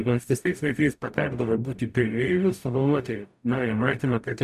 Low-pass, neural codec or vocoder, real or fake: 14.4 kHz; codec, 44.1 kHz, 0.9 kbps, DAC; fake